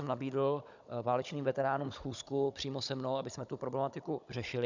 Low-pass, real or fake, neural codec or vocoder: 7.2 kHz; fake; codec, 16 kHz, 16 kbps, FunCodec, trained on Chinese and English, 50 frames a second